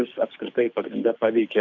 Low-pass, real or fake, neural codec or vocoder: 7.2 kHz; fake; codec, 24 kHz, 6 kbps, HILCodec